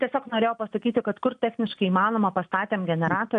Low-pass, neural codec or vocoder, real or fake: 9.9 kHz; none; real